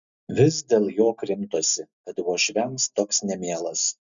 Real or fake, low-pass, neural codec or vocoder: real; 7.2 kHz; none